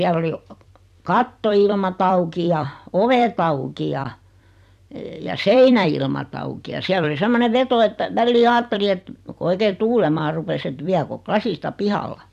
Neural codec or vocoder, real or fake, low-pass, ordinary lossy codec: vocoder, 44.1 kHz, 128 mel bands every 256 samples, BigVGAN v2; fake; 14.4 kHz; none